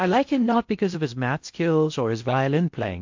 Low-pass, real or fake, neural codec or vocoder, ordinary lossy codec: 7.2 kHz; fake; codec, 16 kHz in and 24 kHz out, 0.6 kbps, FocalCodec, streaming, 4096 codes; MP3, 48 kbps